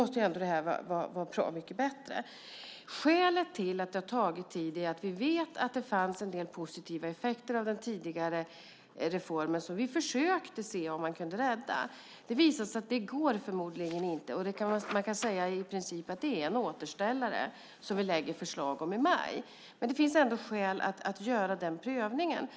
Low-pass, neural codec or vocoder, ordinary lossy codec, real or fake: none; none; none; real